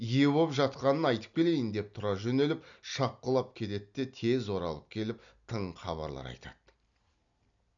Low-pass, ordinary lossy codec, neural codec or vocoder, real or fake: 7.2 kHz; none; none; real